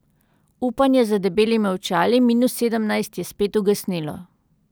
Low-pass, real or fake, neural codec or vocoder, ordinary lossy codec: none; real; none; none